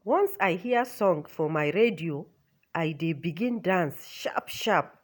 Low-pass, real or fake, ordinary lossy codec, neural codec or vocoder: none; real; none; none